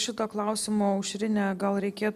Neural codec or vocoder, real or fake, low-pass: none; real; 14.4 kHz